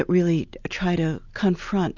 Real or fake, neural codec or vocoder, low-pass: real; none; 7.2 kHz